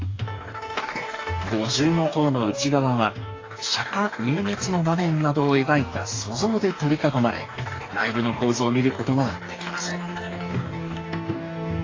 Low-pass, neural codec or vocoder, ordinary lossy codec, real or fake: 7.2 kHz; codec, 44.1 kHz, 2.6 kbps, DAC; AAC, 32 kbps; fake